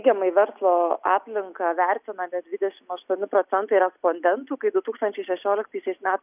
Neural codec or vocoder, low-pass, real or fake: none; 3.6 kHz; real